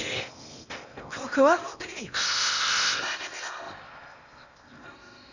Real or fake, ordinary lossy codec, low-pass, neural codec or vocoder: fake; none; 7.2 kHz; codec, 16 kHz in and 24 kHz out, 0.8 kbps, FocalCodec, streaming, 65536 codes